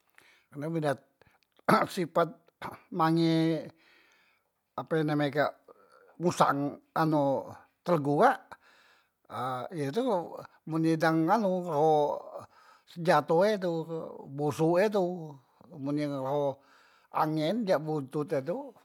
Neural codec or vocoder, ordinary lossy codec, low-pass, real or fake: none; MP3, 96 kbps; 19.8 kHz; real